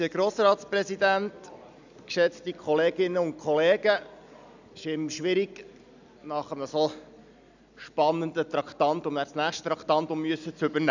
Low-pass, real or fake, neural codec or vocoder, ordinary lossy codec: 7.2 kHz; real; none; none